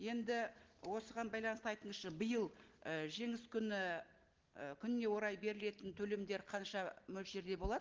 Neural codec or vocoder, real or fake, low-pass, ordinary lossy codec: none; real; 7.2 kHz; Opus, 16 kbps